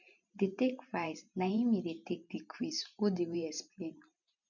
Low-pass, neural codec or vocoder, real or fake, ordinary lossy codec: 7.2 kHz; vocoder, 44.1 kHz, 128 mel bands every 512 samples, BigVGAN v2; fake; none